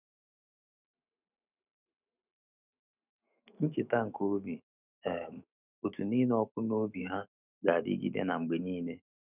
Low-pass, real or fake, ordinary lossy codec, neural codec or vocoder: 3.6 kHz; fake; none; autoencoder, 48 kHz, 128 numbers a frame, DAC-VAE, trained on Japanese speech